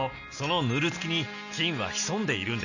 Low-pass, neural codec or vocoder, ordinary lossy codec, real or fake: 7.2 kHz; none; none; real